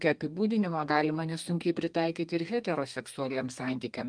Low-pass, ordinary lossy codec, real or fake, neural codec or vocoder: 9.9 kHz; Opus, 32 kbps; fake; codec, 32 kHz, 1.9 kbps, SNAC